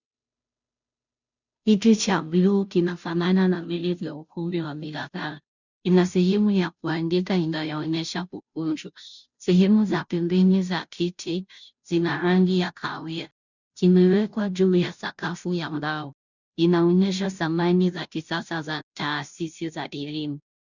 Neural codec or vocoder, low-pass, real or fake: codec, 16 kHz, 0.5 kbps, FunCodec, trained on Chinese and English, 25 frames a second; 7.2 kHz; fake